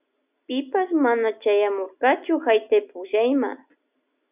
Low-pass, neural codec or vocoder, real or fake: 3.6 kHz; none; real